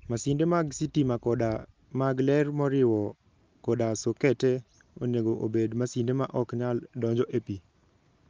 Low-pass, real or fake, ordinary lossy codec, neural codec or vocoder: 7.2 kHz; real; Opus, 24 kbps; none